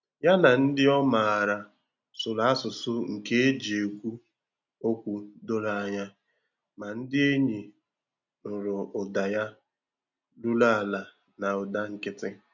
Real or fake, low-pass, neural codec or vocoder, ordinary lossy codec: real; 7.2 kHz; none; none